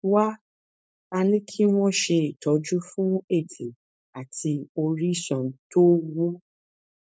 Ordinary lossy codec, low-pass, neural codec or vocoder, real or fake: none; none; codec, 16 kHz, 4.8 kbps, FACodec; fake